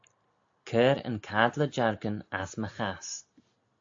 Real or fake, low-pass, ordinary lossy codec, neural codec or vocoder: real; 7.2 kHz; AAC, 48 kbps; none